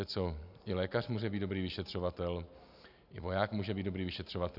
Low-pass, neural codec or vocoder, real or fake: 5.4 kHz; none; real